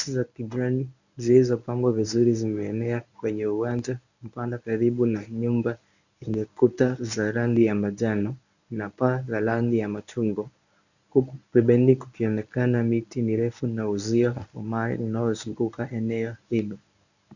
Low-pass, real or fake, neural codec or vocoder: 7.2 kHz; fake; codec, 24 kHz, 0.9 kbps, WavTokenizer, medium speech release version 1